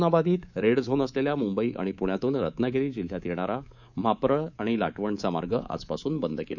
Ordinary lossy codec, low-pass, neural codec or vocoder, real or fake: none; 7.2 kHz; codec, 24 kHz, 3.1 kbps, DualCodec; fake